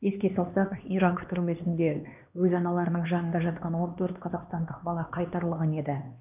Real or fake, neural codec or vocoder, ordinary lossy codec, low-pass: fake; codec, 16 kHz, 2 kbps, X-Codec, HuBERT features, trained on LibriSpeech; none; 3.6 kHz